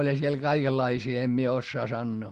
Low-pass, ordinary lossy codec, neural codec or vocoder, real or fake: 14.4 kHz; Opus, 16 kbps; none; real